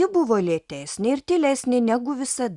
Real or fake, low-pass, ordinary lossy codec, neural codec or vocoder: real; 10.8 kHz; Opus, 64 kbps; none